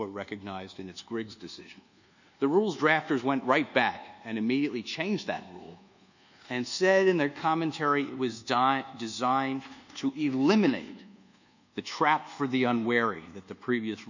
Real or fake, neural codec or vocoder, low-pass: fake; codec, 24 kHz, 1.2 kbps, DualCodec; 7.2 kHz